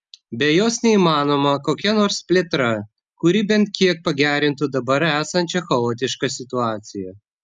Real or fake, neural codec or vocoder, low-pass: real; none; 10.8 kHz